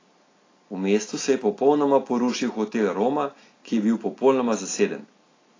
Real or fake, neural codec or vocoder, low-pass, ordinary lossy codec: real; none; 7.2 kHz; AAC, 32 kbps